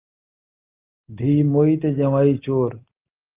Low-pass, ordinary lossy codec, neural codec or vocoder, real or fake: 3.6 kHz; Opus, 32 kbps; none; real